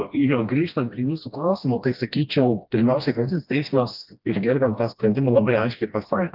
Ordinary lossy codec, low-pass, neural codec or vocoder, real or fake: Opus, 24 kbps; 5.4 kHz; codec, 16 kHz, 1 kbps, FreqCodec, smaller model; fake